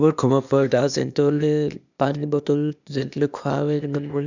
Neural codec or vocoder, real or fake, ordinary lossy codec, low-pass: codec, 16 kHz, 0.8 kbps, ZipCodec; fake; none; 7.2 kHz